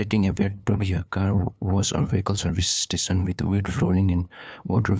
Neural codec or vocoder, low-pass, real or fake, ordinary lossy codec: codec, 16 kHz, 2 kbps, FunCodec, trained on LibriTTS, 25 frames a second; none; fake; none